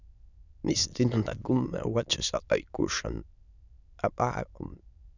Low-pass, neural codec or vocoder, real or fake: 7.2 kHz; autoencoder, 22.05 kHz, a latent of 192 numbers a frame, VITS, trained on many speakers; fake